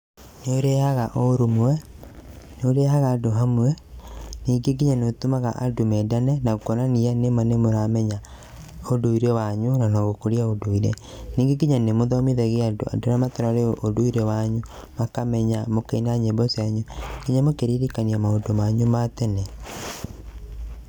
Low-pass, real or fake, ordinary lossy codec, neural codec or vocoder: none; real; none; none